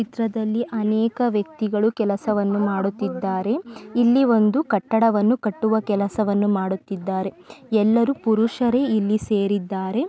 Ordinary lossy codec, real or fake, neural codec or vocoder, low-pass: none; real; none; none